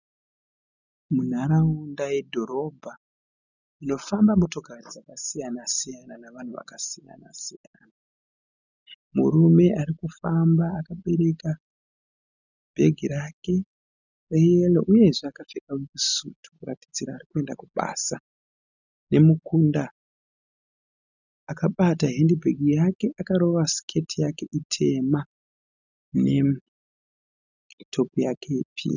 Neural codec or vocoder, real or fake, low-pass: none; real; 7.2 kHz